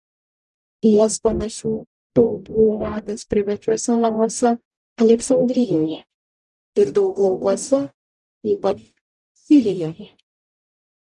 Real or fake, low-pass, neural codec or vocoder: fake; 10.8 kHz; codec, 44.1 kHz, 0.9 kbps, DAC